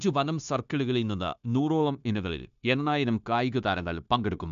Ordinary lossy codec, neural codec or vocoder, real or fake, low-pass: none; codec, 16 kHz, 0.9 kbps, LongCat-Audio-Codec; fake; 7.2 kHz